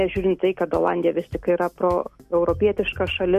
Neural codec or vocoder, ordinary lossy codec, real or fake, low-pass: none; MP3, 64 kbps; real; 14.4 kHz